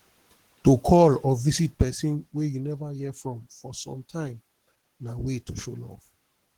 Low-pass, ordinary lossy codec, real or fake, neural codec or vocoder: 19.8 kHz; Opus, 16 kbps; fake; codec, 44.1 kHz, 7.8 kbps, Pupu-Codec